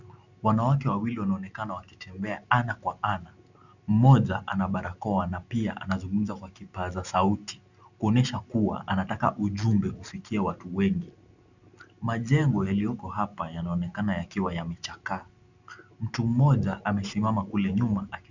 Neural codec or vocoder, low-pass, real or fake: none; 7.2 kHz; real